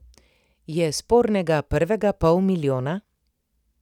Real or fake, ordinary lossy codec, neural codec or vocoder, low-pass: real; none; none; 19.8 kHz